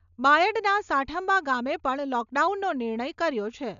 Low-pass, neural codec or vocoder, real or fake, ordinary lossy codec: 7.2 kHz; none; real; none